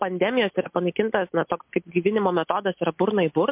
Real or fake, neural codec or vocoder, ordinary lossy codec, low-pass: real; none; MP3, 32 kbps; 3.6 kHz